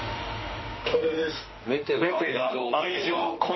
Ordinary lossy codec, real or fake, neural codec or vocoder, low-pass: MP3, 24 kbps; fake; autoencoder, 48 kHz, 32 numbers a frame, DAC-VAE, trained on Japanese speech; 7.2 kHz